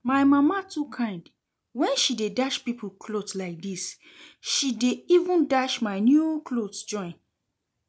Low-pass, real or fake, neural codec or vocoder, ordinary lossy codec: none; real; none; none